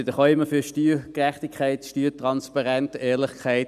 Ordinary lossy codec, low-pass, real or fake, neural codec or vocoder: none; 14.4 kHz; real; none